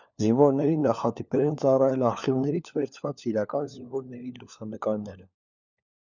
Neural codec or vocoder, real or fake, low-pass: codec, 16 kHz, 4 kbps, FunCodec, trained on LibriTTS, 50 frames a second; fake; 7.2 kHz